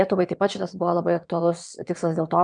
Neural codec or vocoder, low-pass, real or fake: vocoder, 22.05 kHz, 80 mel bands, WaveNeXt; 9.9 kHz; fake